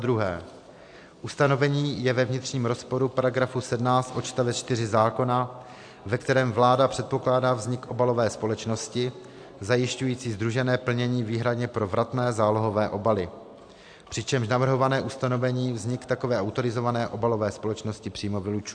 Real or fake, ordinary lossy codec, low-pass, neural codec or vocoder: real; AAC, 64 kbps; 9.9 kHz; none